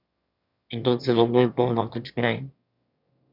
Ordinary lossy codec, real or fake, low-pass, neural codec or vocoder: none; fake; 5.4 kHz; autoencoder, 22.05 kHz, a latent of 192 numbers a frame, VITS, trained on one speaker